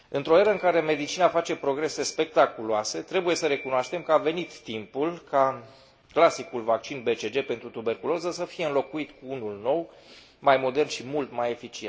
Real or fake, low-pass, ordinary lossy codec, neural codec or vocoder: real; none; none; none